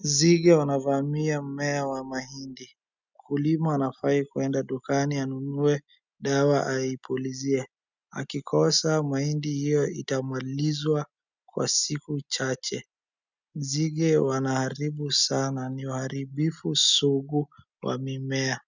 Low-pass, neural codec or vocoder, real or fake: 7.2 kHz; none; real